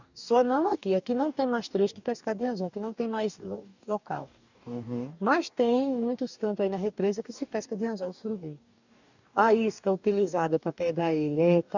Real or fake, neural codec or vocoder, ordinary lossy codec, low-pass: fake; codec, 44.1 kHz, 2.6 kbps, DAC; none; 7.2 kHz